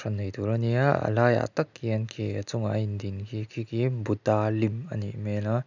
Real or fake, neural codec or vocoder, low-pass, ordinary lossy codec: real; none; 7.2 kHz; none